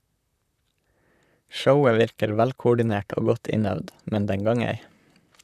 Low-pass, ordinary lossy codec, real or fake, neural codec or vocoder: 14.4 kHz; none; fake; vocoder, 44.1 kHz, 128 mel bands, Pupu-Vocoder